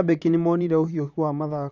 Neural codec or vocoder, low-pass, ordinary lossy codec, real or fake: vocoder, 44.1 kHz, 128 mel bands, Pupu-Vocoder; 7.2 kHz; none; fake